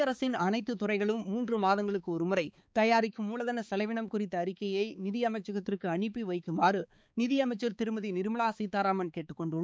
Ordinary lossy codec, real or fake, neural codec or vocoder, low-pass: none; fake; codec, 16 kHz, 4 kbps, X-Codec, HuBERT features, trained on balanced general audio; none